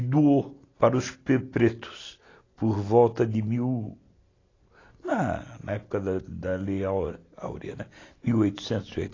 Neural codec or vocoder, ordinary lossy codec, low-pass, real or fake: none; AAC, 32 kbps; 7.2 kHz; real